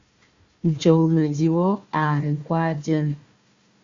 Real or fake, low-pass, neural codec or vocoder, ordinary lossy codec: fake; 7.2 kHz; codec, 16 kHz, 1 kbps, FunCodec, trained on Chinese and English, 50 frames a second; Opus, 64 kbps